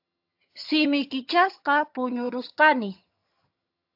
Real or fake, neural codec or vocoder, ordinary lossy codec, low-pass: fake; vocoder, 22.05 kHz, 80 mel bands, HiFi-GAN; AAC, 48 kbps; 5.4 kHz